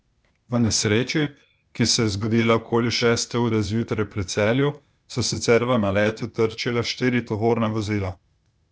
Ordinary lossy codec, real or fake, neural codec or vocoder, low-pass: none; fake; codec, 16 kHz, 0.8 kbps, ZipCodec; none